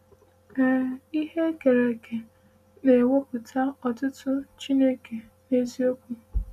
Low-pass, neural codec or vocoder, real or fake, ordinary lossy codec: 14.4 kHz; none; real; none